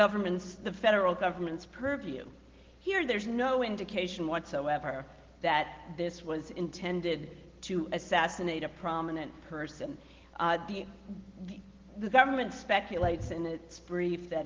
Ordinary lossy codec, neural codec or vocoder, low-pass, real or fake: Opus, 16 kbps; none; 7.2 kHz; real